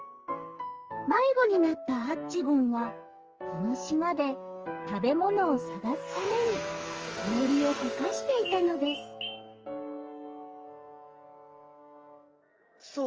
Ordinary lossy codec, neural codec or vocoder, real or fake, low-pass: Opus, 24 kbps; codec, 44.1 kHz, 2.6 kbps, SNAC; fake; 7.2 kHz